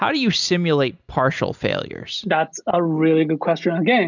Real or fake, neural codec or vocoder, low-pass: real; none; 7.2 kHz